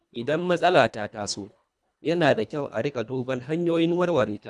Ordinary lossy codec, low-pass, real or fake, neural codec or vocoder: none; none; fake; codec, 24 kHz, 1.5 kbps, HILCodec